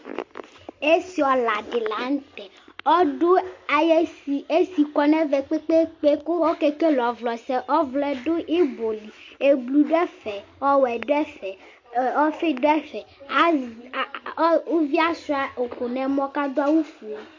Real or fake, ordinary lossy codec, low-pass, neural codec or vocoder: real; MP3, 48 kbps; 7.2 kHz; none